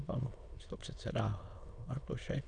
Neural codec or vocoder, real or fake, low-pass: autoencoder, 22.05 kHz, a latent of 192 numbers a frame, VITS, trained on many speakers; fake; 9.9 kHz